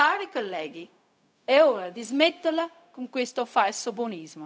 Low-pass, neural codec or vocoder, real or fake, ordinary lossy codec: none; codec, 16 kHz, 0.4 kbps, LongCat-Audio-Codec; fake; none